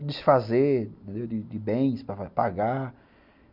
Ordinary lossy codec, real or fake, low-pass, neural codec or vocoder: none; real; 5.4 kHz; none